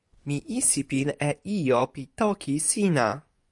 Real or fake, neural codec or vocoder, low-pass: fake; vocoder, 24 kHz, 100 mel bands, Vocos; 10.8 kHz